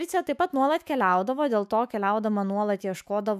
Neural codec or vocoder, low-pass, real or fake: autoencoder, 48 kHz, 128 numbers a frame, DAC-VAE, trained on Japanese speech; 14.4 kHz; fake